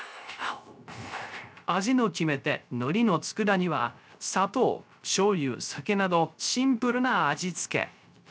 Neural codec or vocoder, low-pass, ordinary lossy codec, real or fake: codec, 16 kHz, 0.3 kbps, FocalCodec; none; none; fake